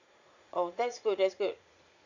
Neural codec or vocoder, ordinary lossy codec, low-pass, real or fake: vocoder, 44.1 kHz, 80 mel bands, Vocos; MP3, 64 kbps; 7.2 kHz; fake